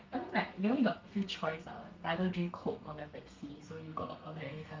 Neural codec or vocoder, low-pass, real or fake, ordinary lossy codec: codec, 32 kHz, 1.9 kbps, SNAC; 7.2 kHz; fake; Opus, 32 kbps